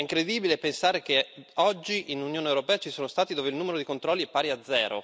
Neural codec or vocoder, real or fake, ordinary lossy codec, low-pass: none; real; none; none